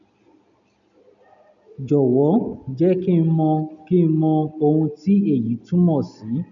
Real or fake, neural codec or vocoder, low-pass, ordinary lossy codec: real; none; 7.2 kHz; MP3, 96 kbps